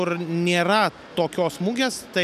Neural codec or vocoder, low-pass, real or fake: none; 14.4 kHz; real